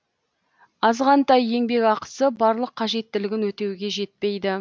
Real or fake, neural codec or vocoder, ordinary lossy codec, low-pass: real; none; none; none